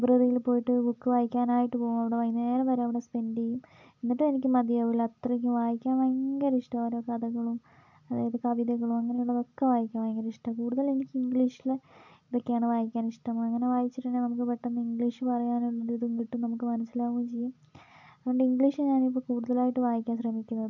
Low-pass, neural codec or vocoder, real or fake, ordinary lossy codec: 7.2 kHz; none; real; none